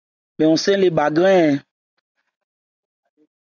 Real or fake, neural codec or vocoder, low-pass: real; none; 7.2 kHz